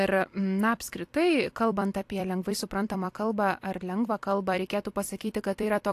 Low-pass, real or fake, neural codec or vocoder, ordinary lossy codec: 14.4 kHz; fake; vocoder, 44.1 kHz, 128 mel bands every 256 samples, BigVGAN v2; AAC, 64 kbps